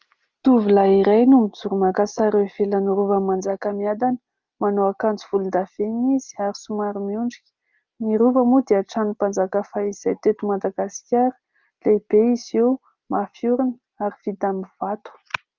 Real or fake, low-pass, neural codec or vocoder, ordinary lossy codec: real; 7.2 kHz; none; Opus, 24 kbps